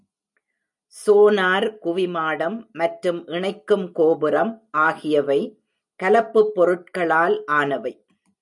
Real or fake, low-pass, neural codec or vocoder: real; 10.8 kHz; none